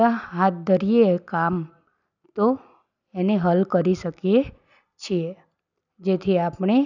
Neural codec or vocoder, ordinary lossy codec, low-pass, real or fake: none; none; 7.2 kHz; real